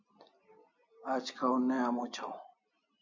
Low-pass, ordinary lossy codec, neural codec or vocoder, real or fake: 7.2 kHz; MP3, 64 kbps; none; real